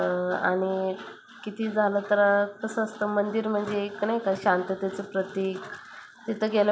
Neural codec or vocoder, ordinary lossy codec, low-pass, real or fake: none; none; none; real